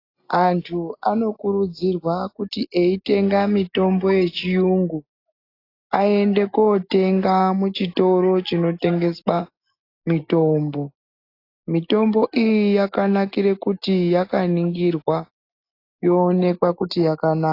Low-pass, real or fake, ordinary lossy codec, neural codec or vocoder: 5.4 kHz; real; AAC, 32 kbps; none